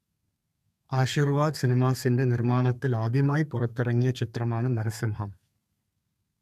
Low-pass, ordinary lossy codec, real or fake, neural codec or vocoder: 14.4 kHz; none; fake; codec, 32 kHz, 1.9 kbps, SNAC